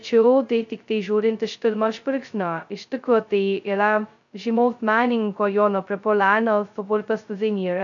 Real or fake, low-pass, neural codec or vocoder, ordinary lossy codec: fake; 7.2 kHz; codec, 16 kHz, 0.2 kbps, FocalCodec; AAC, 64 kbps